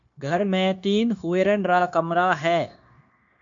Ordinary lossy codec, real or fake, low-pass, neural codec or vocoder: MP3, 48 kbps; fake; 7.2 kHz; codec, 16 kHz, 0.9 kbps, LongCat-Audio-Codec